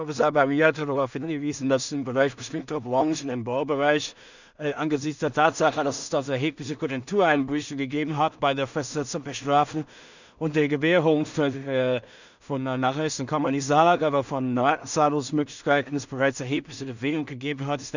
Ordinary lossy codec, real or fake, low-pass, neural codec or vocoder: none; fake; 7.2 kHz; codec, 16 kHz in and 24 kHz out, 0.4 kbps, LongCat-Audio-Codec, two codebook decoder